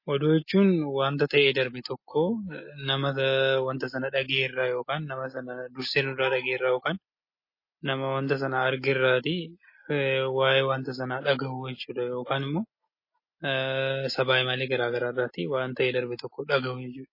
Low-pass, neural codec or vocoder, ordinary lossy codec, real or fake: 5.4 kHz; none; MP3, 24 kbps; real